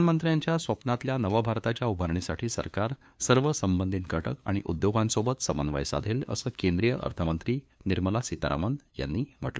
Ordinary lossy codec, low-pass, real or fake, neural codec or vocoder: none; none; fake; codec, 16 kHz, 2 kbps, FunCodec, trained on LibriTTS, 25 frames a second